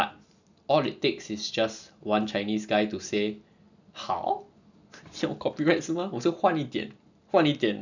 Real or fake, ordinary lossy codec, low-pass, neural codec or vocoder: real; none; 7.2 kHz; none